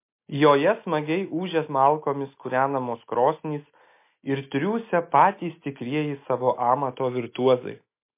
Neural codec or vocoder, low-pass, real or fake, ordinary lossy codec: none; 3.6 kHz; real; MP3, 24 kbps